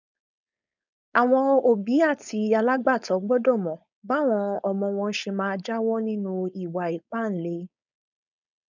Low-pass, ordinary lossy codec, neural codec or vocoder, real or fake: 7.2 kHz; none; codec, 16 kHz, 4.8 kbps, FACodec; fake